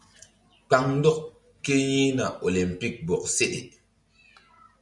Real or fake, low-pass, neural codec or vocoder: real; 10.8 kHz; none